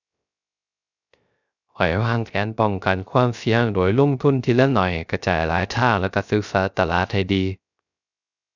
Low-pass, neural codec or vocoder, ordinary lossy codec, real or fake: 7.2 kHz; codec, 16 kHz, 0.3 kbps, FocalCodec; none; fake